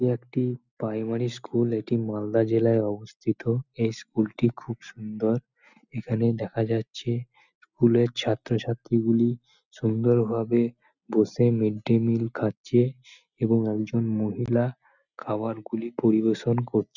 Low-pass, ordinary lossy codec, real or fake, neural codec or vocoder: 7.2 kHz; none; real; none